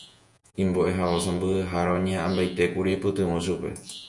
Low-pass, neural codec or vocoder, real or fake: 10.8 kHz; vocoder, 48 kHz, 128 mel bands, Vocos; fake